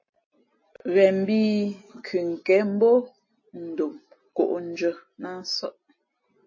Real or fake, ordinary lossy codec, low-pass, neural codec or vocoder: real; MP3, 32 kbps; 7.2 kHz; none